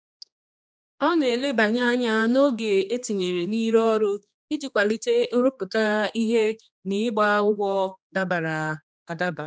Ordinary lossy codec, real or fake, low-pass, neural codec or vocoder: none; fake; none; codec, 16 kHz, 2 kbps, X-Codec, HuBERT features, trained on general audio